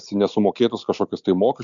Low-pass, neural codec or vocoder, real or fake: 7.2 kHz; none; real